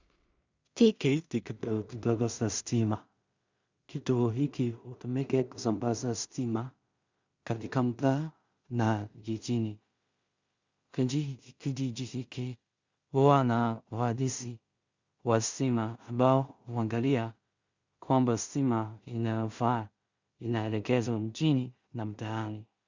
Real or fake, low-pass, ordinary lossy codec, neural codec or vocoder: fake; 7.2 kHz; Opus, 64 kbps; codec, 16 kHz in and 24 kHz out, 0.4 kbps, LongCat-Audio-Codec, two codebook decoder